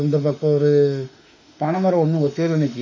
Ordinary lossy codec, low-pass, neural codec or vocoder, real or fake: MP3, 64 kbps; 7.2 kHz; autoencoder, 48 kHz, 32 numbers a frame, DAC-VAE, trained on Japanese speech; fake